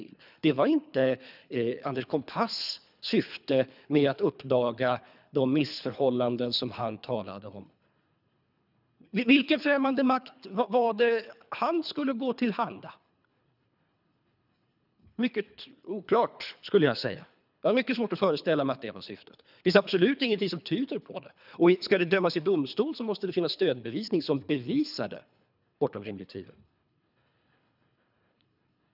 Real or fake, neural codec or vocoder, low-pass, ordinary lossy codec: fake; codec, 24 kHz, 3 kbps, HILCodec; 5.4 kHz; none